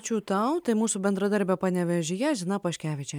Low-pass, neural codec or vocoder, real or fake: 19.8 kHz; none; real